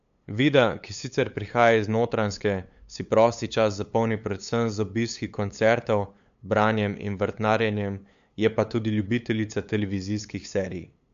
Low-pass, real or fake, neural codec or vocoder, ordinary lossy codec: 7.2 kHz; fake; codec, 16 kHz, 8 kbps, FunCodec, trained on LibriTTS, 25 frames a second; MP3, 64 kbps